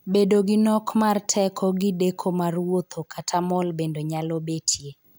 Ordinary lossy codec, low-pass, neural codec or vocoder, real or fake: none; none; none; real